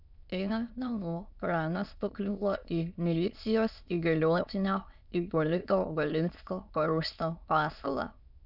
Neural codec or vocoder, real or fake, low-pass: autoencoder, 22.05 kHz, a latent of 192 numbers a frame, VITS, trained on many speakers; fake; 5.4 kHz